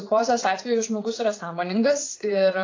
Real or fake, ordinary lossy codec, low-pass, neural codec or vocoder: fake; AAC, 32 kbps; 7.2 kHz; vocoder, 44.1 kHz, 80 mel bands, Vocos